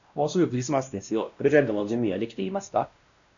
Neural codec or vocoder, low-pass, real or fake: codec, 16 kHz, 1 kbps, X-Codec, WavLM features, trained on Multilingual LibriSpeech; 7.2 kHz; fake